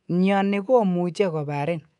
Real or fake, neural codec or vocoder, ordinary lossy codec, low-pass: fake; codec, 24 kHz, 3.1 kbps, DualCodec; none; 10.8 kHz